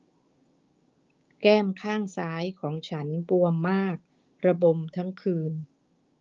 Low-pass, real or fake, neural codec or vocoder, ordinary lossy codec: 7.2 kHz; fake; codec, 16 kHz, 6 kbps, DAC; Opus, 32 kbps